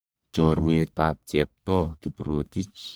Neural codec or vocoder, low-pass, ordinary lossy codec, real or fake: codec, 44.1 kHz, 1.7 kbps, Pupu-Codec; none; none; fake